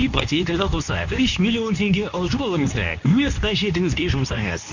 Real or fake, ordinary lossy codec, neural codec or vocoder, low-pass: fake; none; codec, 24 kHz, 0.9 kbps, WavTokenizer, medium speech release version 2; 7.2 kHz